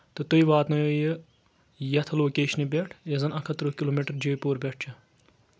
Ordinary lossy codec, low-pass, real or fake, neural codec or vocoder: none; none; real; none